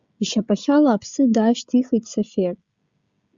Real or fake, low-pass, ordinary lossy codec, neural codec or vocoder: fake; 7.2 kHz; Opus, 64 kbps; codec, 16 kHz, 16 kbps, FreqCodec, smaller model